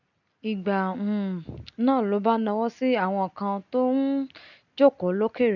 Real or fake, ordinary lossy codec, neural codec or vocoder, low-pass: real; none; none; 7.2 kHz